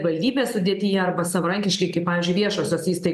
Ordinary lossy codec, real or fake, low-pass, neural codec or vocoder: MP3, 96 kbps; real; 14.4 kHz; none